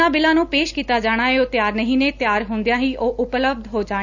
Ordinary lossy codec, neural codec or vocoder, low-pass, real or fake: none; none; 7.2 kHz; real